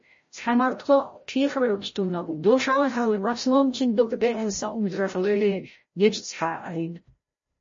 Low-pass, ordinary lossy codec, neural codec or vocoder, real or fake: 7.2 kHz; MP3, 32 kbps; codec, 16 kHz, 0.5 kbps, FreqCodec, larger model; fake